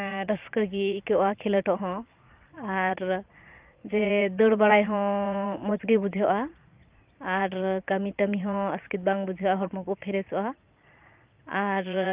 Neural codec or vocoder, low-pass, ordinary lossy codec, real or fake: vocoder, 22.05 kHz, 80 mel bands, Vocos; 3.6 kHz; Opus, 24 kbps; fake